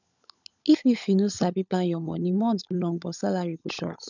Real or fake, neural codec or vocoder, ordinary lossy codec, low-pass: fake; codec, 16 kHz, 16 kbps, FunCodec, trained on LibriTTS, 50 frames a second; none; 7.2 kHz